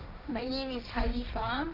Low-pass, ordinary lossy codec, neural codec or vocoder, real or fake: 5.4 kHz; none; codec, 16 kHz, 1.1 kbps, Voila-Tokenizer; fake